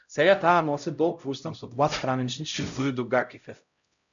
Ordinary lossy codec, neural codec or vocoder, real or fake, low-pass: MP3, 96 kbps; codec, 16 kHz, 0.5 kbps, X-Codec, HuBERT features, trained on LibriSpeech; fake; 7.2 kHz